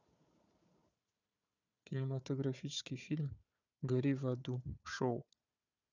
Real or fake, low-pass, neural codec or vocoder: fake; 7.2 kHz; codec, 16 kHz, 4 kbps, FunCodec, trained on Chinese and English, 50 frames a second